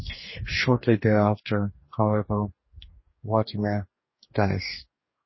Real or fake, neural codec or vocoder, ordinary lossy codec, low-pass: fake; codec, 44.1 kHz, 2.6 kbps, DAC; MP3, 24 kbps; 7.2 kHz